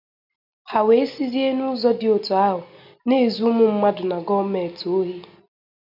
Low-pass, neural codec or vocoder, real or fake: 5.4 kHz; none; real